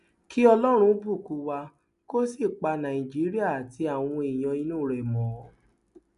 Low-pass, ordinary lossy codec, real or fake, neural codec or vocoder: 10.8 kHz; none; real; none